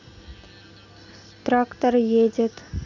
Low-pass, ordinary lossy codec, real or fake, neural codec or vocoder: 7.2 kHz; none; real; none